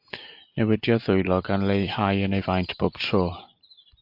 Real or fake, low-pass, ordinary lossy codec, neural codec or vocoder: real; 5.4 kHz; MP3, 48 kbps; none